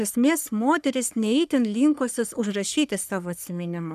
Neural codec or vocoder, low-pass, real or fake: codec, 44.1 kHz, 3.4 kbps, Pupu-Codec; 14.4 kHz; fake